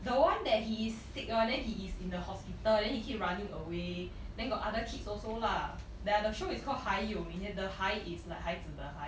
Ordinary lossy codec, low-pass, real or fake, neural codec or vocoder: none; none; real; none